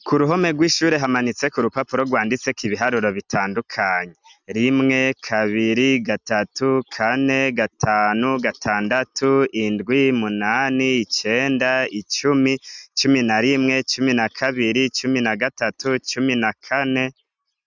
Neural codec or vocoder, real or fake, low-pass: none; real; 7.2 kHz